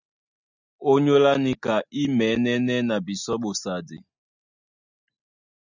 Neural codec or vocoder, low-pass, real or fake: none; 7.2 kHz; real